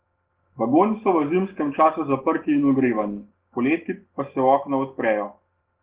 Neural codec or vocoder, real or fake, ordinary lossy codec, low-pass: none; real; Opus, 16 kbps; 3.6 kHz